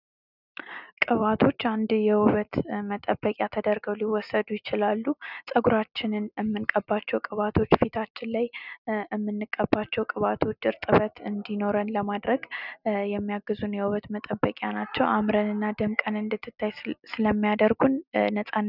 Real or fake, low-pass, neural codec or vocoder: real; 5.4 kHz; none